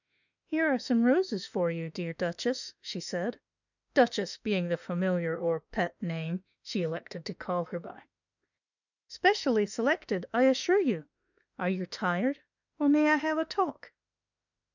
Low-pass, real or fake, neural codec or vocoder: 7.2 kHz; fake; autoencoder, 48 kHz, 32 numbers a frame, DAC-VAE, trained on Japanese speech